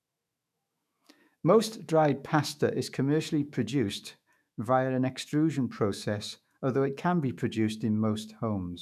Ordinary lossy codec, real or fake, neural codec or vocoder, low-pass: none; fake; autoencoder, 48 kHz, 128 numbers a frame, DAC-VAE, trained on Japanese speech; 14.4 kHz